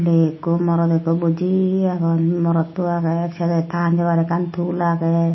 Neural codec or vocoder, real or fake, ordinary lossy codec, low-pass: none; real; MP3, 24 kbps; 7.2 kHz